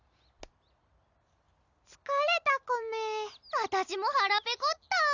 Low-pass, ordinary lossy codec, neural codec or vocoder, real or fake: 7.2 kHz; none; none; real